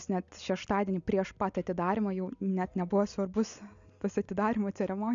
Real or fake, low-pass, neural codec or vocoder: real; 7.2 kHz; none